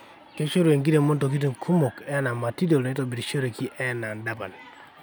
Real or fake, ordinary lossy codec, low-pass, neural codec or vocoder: real; none; none; none